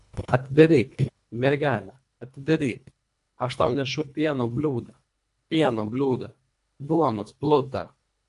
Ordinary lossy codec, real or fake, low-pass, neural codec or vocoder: AAC, 64 kbps; fake; 10.8 kHz; codec, 24 kHz, 1.5 kbps, HILCodec